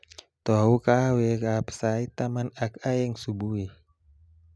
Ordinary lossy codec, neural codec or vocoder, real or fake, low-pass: none; none; real; none